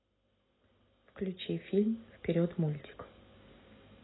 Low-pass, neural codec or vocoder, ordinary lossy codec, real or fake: 7.2 kHz; none; AAC, 16 kbps; real